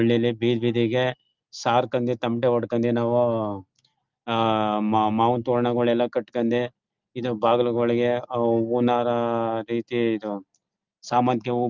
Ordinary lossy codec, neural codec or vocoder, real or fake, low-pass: Opus, 24 kbps; none; real; 7.2 kHz